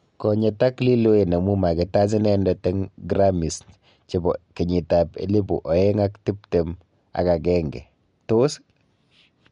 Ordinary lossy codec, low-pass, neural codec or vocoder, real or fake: MP3, 64 kbps; 10.8 kHz; none; real